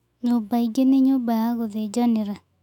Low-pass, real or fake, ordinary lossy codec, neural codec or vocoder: 19.8 kHz; fake; none; autoencoder, 48 kHz, 128 numbers a frame, DAC-VAE, trained on Japanese speech